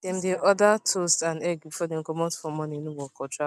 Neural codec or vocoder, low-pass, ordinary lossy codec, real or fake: none; 14.4 kHz; none; real